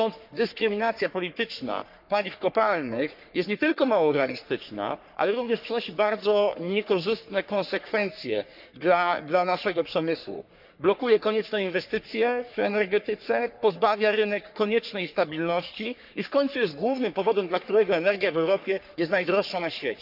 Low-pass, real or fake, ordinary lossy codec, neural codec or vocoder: 5.4 kHz; fake; none; codec, 44.1 kHz, 3.4 kbps, Pupu-Codec